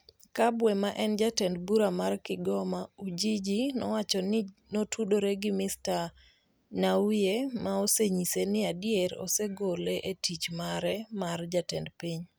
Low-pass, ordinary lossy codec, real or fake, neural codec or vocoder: none; none; real; none